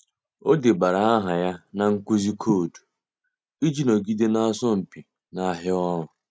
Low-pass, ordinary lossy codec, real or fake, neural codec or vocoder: none; none; real; none